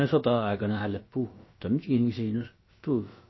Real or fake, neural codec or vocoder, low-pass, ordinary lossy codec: fake; codec, 16 kHz, about 1 kbps, DyCAST, with the encoder's durations; 7.2 kHz; MP3, 24 kbps